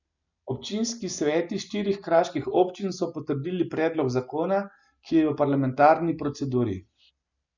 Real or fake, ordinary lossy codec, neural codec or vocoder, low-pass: real; none; none; 7.2 kHz